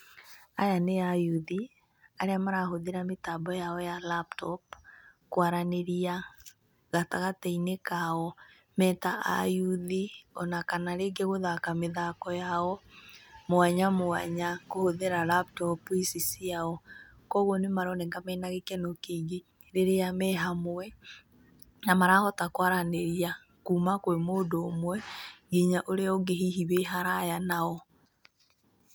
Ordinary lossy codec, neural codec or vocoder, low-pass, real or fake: none; none; none; real